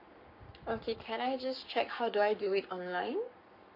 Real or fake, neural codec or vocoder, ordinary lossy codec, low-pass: fake; codec, 44.1 kHz, 7.8 kbps, Pupu-Codec; none; 5.4 kHz